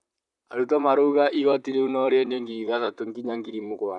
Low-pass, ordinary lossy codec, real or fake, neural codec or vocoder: 10.8 kHz; none; fake; vocoder, 44.1 kHz, 128 mel bands, Pupu-Vocoder